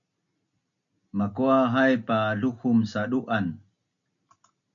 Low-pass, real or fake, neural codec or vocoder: 7.2 kHz; real; none